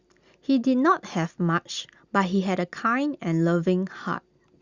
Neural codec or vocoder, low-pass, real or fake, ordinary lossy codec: none; 7.2 kHz; real; Opus, 64 kbps